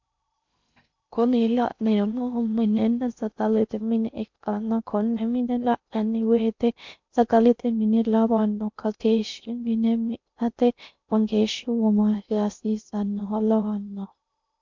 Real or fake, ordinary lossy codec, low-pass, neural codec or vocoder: fake; MP3, 64 kbps; 7.2 kHz; codec, 16 kHz in and 24 kHz out, 0.6 kbps, FocalCodec, streaming, 2048 codes